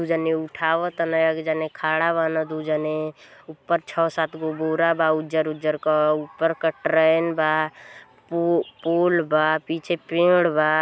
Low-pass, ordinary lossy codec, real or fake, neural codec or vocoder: none; none; real; none